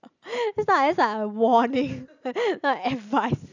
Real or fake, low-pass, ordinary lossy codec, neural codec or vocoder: real; 7.2 kHz; none; none